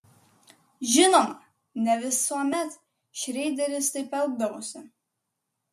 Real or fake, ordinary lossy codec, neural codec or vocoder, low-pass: real; MP3, 64 kbps; none; 14.4 kHz